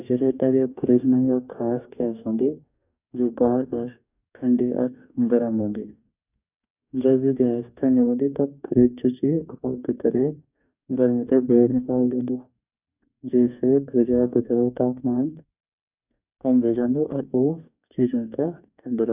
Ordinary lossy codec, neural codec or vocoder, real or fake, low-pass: none; codec, 44.1 kHz, 2.6 kbps, DAC; fake; 3.6 kHz